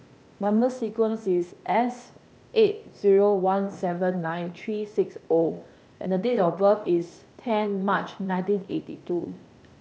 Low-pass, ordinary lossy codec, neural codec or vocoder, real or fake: none; none; codec, 16 kHz, 0.8 kbps, ZipCodec; fake